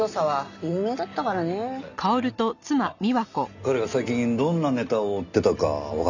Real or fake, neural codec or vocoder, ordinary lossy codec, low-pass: real; none; none; 7.2 kHz